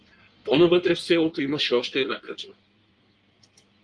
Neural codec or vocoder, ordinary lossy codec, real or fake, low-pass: codec, 16 kHz in and 24 kHz out, 1.1 kbps, FireRedTTS-2 codec; Opus, 32 kbps; fake; 9.9 kHz